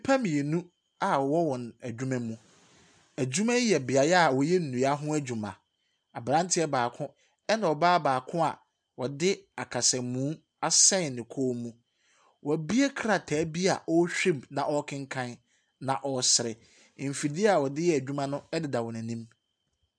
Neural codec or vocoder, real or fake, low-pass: none; real; 9.9 kHz